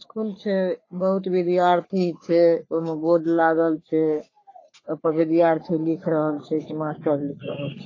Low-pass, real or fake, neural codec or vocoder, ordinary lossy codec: 7.2 kHz; fake; codec, 44.1 kHz, 3.4 kbps, Pupu-Codec; AAC, 32 kbps